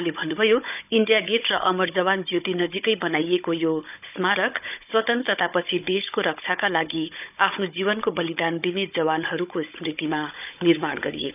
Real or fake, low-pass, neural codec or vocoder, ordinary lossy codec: fake; 3.6 kHz; codec, 16 kHz, 16 kbps, FunCodec, trained on Chinese and English, 50 frames a second; none